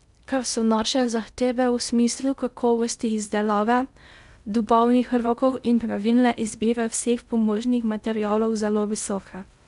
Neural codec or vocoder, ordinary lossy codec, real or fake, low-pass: codec, 16 kHz in and 24 kHz out, 0.6 kbps, FocalCodec, streaming, 2048 codes; none; fake; 10.8 kHz